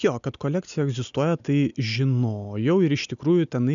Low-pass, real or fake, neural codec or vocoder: 7.2 kHz; real; none